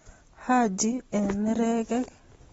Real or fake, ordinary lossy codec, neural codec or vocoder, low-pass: real; AAC, 24 kbps; none; 19.8 kHz